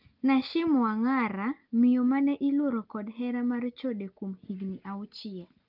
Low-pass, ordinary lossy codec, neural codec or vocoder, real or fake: 5.4 kHz; Opus, 24 kbps; none; real